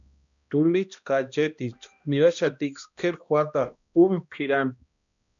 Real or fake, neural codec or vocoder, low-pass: fake; codec, 16 kHz, 1 kbps, X-Codec, HuBERT features, trained on balanced general audio; 7.2 kHz